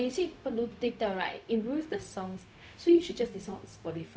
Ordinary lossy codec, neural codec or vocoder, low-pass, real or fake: none; codec, 16 kHz, 0.4 kbps, LongCat-Audio-Codec; none; fake